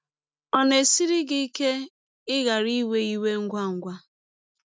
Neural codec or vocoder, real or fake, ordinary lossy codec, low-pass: none; real; none; none